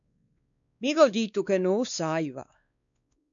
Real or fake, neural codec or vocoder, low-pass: fake; codec, 16 kHz, 2 kbps, X-Codec, WavLM features, trained on Multilingual LibriSpeech; 7.2 kHz